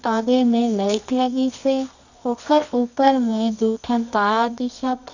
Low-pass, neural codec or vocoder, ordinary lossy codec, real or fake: 7.2 kHz; codec, 24 kHz, 0.9 kbps, WavTokenizer, medium music audio release; none; fake